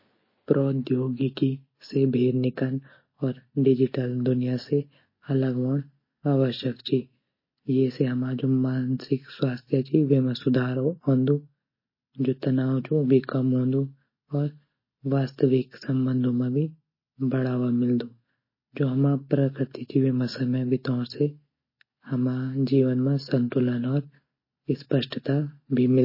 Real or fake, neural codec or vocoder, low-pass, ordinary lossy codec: real; none; 5.4 kHz; MP3, 24 kbps